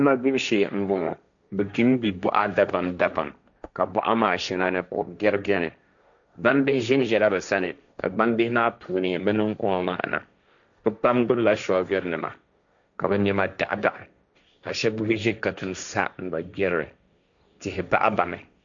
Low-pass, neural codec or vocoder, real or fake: 7.2 kHz; codec, 16 kHz, 1.1 kbps, Voila-Tokenizer; fake